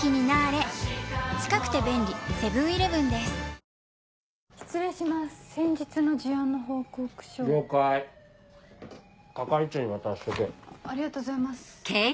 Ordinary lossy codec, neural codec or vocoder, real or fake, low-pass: none; none; real; none